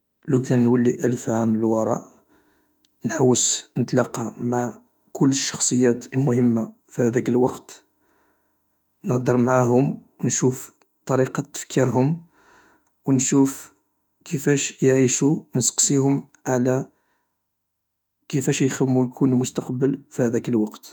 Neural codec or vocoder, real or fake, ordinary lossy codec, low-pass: autoencoder, 48 kHz, 32 numbers a frame, DAC-VAE, trained on Japanese speech; fake; none; 19.8 kHz